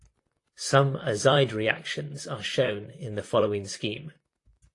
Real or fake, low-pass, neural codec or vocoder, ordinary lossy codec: fake; 10.8 kHz; vocoder, 44.1 kHz, 128 mel bands, Pupu-Vocoder; AAC, 48 kbps